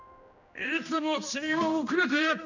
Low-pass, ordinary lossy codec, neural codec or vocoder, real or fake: 7.2 kHz; none; codec, 16 kHz, 1 kbps, X-Codec, HuBERT features, trained on general audio; fake